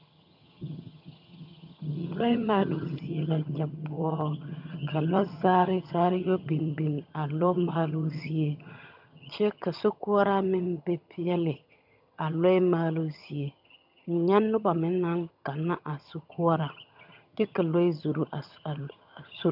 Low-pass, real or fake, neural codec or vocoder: 5.4 kHz; fake; vocoder, 22.05 kHz, 80 mel bands, HiFi-GAN